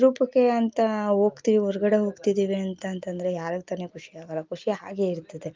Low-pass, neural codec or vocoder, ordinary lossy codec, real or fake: 7.2 kHz; none; Opus, 24 kbps; real